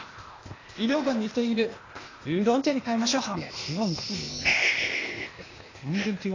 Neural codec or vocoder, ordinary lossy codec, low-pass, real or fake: codec, 16 kHz, 0.8 kbps, ZipCodec; AAC, 32 kbps; 7.2 kHz; fake